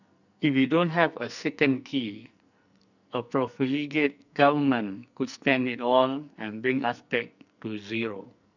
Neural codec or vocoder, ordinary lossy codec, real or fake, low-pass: codec, 44.1 kHz, 2.6 kbps, SNAC; none; fake; 7.2 kHz